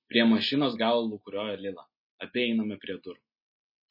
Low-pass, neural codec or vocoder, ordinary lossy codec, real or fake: 5.4 kHz; none; MP3, 24 kbps; real